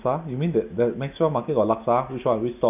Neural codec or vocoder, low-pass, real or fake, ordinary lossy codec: none; 3.6 kHz; real; none